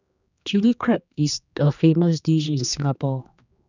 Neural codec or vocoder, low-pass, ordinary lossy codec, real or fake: codec, 16 kHz, 2 kbps, X-Codec, HuBERT features, trained on general audio; 7.2 kHz; none; fake